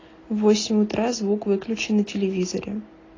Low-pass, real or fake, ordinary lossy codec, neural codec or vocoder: 7.2 kHz; real; AAC, 32 kbps; none